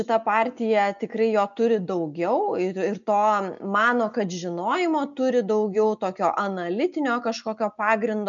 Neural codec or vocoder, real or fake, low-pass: none; real; 7.2 kHz